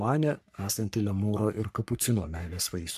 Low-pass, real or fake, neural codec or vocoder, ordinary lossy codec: 14.4 kHz; fake; codec, 44.1 kHz, 3.4 kbps, Pupu-Codec; MP3, 96 kbps